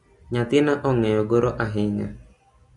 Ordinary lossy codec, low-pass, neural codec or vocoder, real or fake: Opus, 64 kbps; 10.8 kHz; none; real